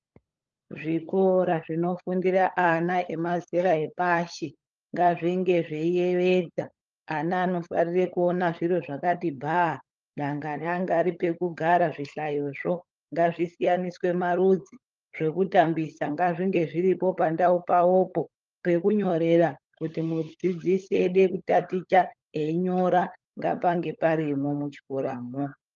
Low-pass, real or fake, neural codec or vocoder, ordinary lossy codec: 7.2 kHz; fake; codec, 16 kHz, 16 kbps, FunCodec, trained on LibriTTS, 50 frames a second; Opus, 24 kbps